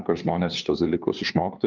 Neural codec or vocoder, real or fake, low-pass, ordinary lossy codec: codec, 16 kHz, 2 kbps, FunCodec, trained on LibriTTS, 25 frames a second; fake; 7.2 kHz; Opus, 32 kbps